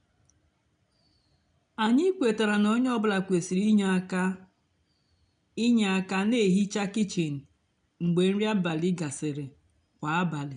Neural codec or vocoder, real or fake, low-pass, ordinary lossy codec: none; real; 9.9 kHz; none